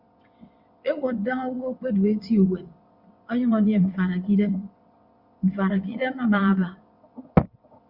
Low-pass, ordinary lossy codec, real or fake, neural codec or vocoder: 5.4 kHz; Opus, 64 kbps; fake; vocoder, 22.05 kHz, 80 mel bands, WaveNeXt